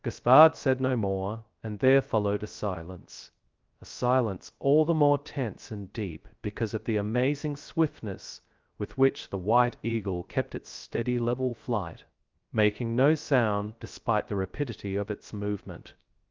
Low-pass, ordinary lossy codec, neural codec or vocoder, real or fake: 7.2 kHz; Opus, 32 kbps; codec, 16 kHz, 0.3 kbps, FocalCodec; fake